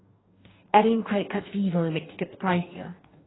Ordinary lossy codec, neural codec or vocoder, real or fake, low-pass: AAC, 16 kbps; codec, 44.1 kHz, 2.6 kbps, DAC; fake; 7.2 kHz